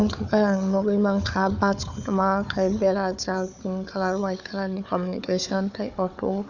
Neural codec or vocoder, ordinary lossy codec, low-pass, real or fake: codec, 16 kHz, 4 kbps, FunCodec, trained on Chinese and English, 50 frames a second; none; 7.2 kHz; fake